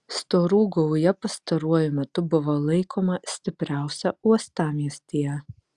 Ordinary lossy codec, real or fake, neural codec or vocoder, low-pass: Opus, 64 kbps; real; none; 10.8 kHz